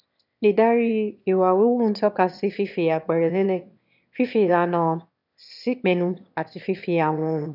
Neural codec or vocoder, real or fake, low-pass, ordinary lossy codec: autoencoder, 22.05 kHz, a latent of 192 numbers a frame, VITS, trained on one speaker; fake; 5.4 kHz; none